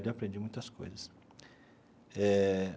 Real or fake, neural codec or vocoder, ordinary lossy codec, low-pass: real; none; none; none